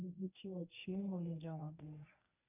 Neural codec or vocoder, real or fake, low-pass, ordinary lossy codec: codec, 24 kHz, 0.9 kbps, WavTokenizer, medium speech release version 1; fake; 3.6 kHz; none